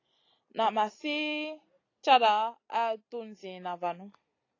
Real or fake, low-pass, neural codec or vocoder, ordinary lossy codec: real; 7.2 kHz; none; AAC, 32 kbps